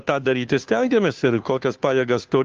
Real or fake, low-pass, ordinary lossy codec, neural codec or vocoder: fake; 7.2 kHz; Opus, 32 kbps; codec, 16 kHz, 2 kbps, FunCodec, trained on Chinese and English, 25 frames a second